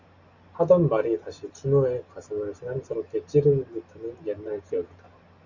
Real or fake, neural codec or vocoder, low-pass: real; none; 7.2 kHz